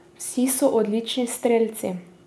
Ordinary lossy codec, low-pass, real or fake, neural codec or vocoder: none; none; real; none